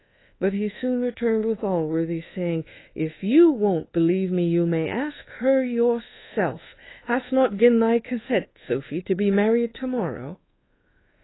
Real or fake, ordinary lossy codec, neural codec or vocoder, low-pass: fake; AAC, 16 kbps; codec, 24 kHz, 1.2 kbps, DualCodec; 7.2 kHz